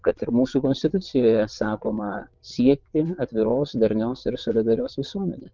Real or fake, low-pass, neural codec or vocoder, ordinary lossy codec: fake; 7.2 kHz; vocoder, 22.05 kHz, 80 mel bands, WaveNeXt; Opus, 32 kbps